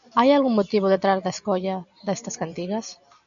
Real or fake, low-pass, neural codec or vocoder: real; 7.2 kHz; none